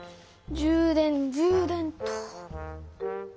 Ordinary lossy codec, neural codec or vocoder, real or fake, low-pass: none; none; real; none